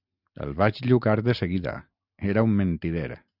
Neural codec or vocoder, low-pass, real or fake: none; 5.4 kHz; real